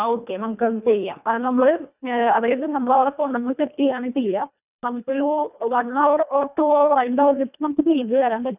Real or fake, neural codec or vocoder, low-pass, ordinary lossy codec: fake; codec, 24 kHz, 1.5 kbps, HILCodec; 3.6 kHz; none